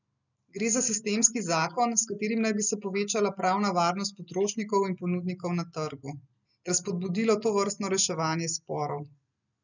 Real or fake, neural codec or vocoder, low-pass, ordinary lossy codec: real; none; 7.2 kHz; none